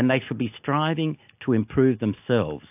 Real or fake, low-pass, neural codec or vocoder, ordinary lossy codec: real; 3.6 kHz; none; AAC, 32 kbps